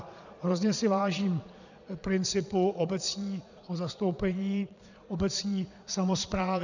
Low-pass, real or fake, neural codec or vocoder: 7.2 kHz; fake; vocoder, 22.05 kHz, 80 mel bands, Vocos